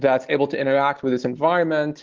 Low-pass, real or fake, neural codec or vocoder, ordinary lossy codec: 7.2 kHz; real; none; Opus, 16 kbps